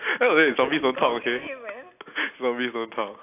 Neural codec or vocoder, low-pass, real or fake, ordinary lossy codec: none; 3.6 kHz; real; AAC, 32 kbps